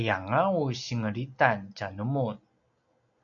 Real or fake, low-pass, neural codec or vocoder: real; 7.2 kHz; none